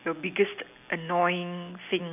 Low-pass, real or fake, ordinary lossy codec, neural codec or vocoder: 3.6 kHz; real; none; none